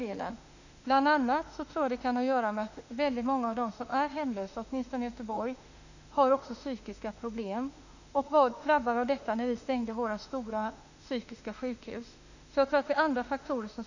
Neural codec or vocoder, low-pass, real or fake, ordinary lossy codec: autoencoder, 48 kHz, 32 numbers a frame, DAC-VAE, trained on Japanese speech; 7.2 kHz; fake; none